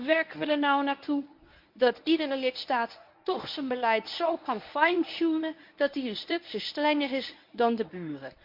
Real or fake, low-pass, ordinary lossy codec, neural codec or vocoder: fake; 5.4 kHz; MP3, 48 kbps; codec, 24 kHz, 0.9 kbps, WavTokenizer, medium speech release version 1